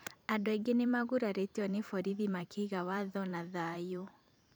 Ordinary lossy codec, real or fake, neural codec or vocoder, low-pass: none; fake; vocoder, 44.1 kHz, 128 mel bands every 512 samples, BigVGAN v2; none